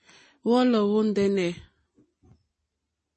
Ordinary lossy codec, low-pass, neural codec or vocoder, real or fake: MP3, 32 kbps; 10.8 kHz; none; real